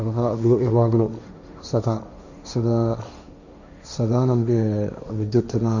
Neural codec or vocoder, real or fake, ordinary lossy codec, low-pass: codec, 16 kHz, 1.1 kbps, Voila-Tokenizer; fake; none; 7.2 kHz